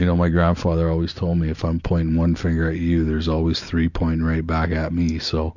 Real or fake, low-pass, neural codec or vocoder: real; 7.2 kHz; none